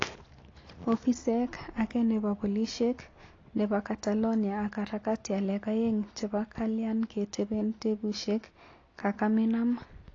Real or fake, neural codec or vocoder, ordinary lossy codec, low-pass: real; none; AAC, 32 kbps; 7.2 kHz